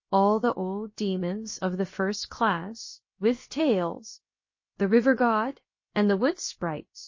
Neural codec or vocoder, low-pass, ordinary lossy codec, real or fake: codec, 16 kHz, about 1 kbps, DyCAST, with the encoder's durations; 7.2 kHz; MP3, 32 kbps; fake